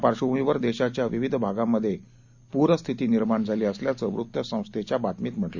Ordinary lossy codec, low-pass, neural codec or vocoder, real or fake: Opus, 64 kbps; 7.2 kHz; none; real